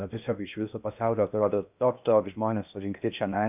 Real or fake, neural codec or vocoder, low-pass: fake; codec, 16 kHz in and 24 kHz out, 0.6 kbps, FocalCodec, streaming, 2048 codes; 3.6 kHz